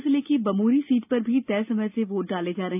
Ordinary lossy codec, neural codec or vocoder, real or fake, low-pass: none; none; real; 3.6 kHz